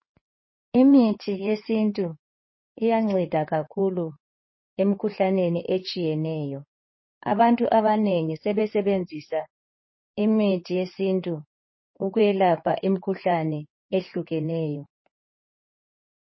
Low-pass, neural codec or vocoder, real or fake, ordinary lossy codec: 7.2 kHz; codec, 16 kHz in and 24 kHz out, 2.2 kbps, FireRedTTS-2 codec; fake; MP3, 24 kbps